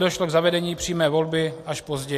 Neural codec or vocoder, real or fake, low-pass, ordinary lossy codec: none; real; 14.4 kHz; AAC, 64 kbps